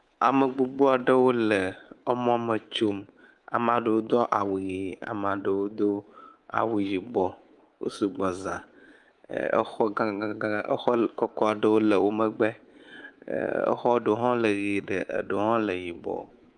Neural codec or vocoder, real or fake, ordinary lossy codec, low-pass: codec, 24 kHz, 3.1 kbps, DualCodec; fake; Opus, 32 kbps; 10.8 kHz